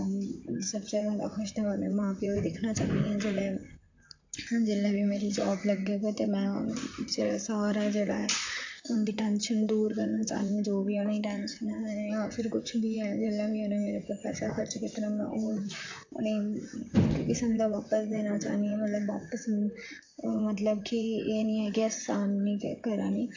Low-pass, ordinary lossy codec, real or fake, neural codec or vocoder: 7.2 kHz; AAC, 48 kbps; fake; vocoder, 44.1 kHz, 128 mel bands, Pupu-Vocoder